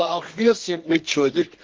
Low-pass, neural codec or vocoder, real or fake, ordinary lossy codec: 7.2 kHz; codec, 24 kHz, 0.9 kbps, WavTokenizer, medium music audio release; fake; Opus, 16 kbps